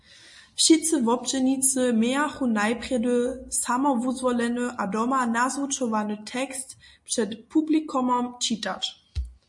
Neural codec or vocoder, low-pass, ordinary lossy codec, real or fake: none; 10.8 kHz; MP3, 48 kbps; real